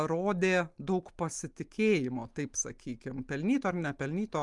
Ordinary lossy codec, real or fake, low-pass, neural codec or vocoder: Opus, 32 kbps; real; 10.8 kHz; none